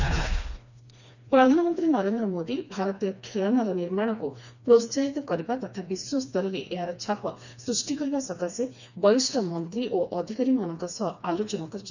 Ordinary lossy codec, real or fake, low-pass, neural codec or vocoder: none; fake; 7.2 kHz; codec, 16 kHz, 2 kbps, FreqCodec, smaller model